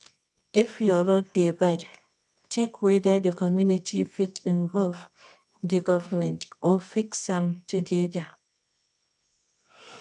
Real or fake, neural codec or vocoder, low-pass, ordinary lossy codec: fake; codec, 24 kHz, 0.9 kbps, WavTokenizer, medium music audio release; 10.8 kHz; none